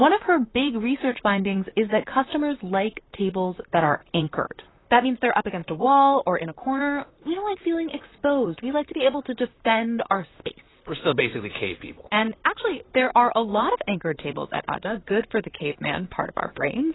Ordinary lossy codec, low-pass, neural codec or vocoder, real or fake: AAC, 16 kbps; 7.2 kHz; vocoder, 44.1 kHz, 128 mel bands, Pupu-Vocoder; fake